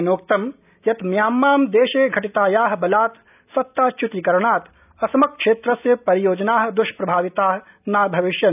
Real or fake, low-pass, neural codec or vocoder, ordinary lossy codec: real; 3.6 kHz; none; none